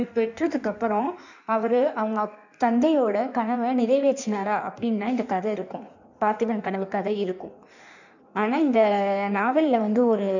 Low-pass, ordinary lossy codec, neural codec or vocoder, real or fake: 7.2 kHz; none; codec, 16 kHz in and 24 kHz out, 1.1 kbps, FireRedTTS-2 codec; fake